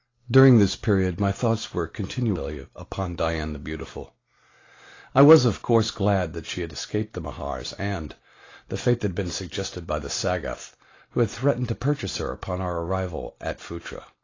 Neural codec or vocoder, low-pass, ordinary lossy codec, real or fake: none; 7.2 kHz; AAC, 32 kbps; real